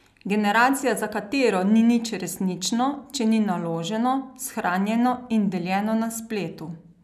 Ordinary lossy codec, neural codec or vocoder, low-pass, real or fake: none; none; 14.4 kHz; real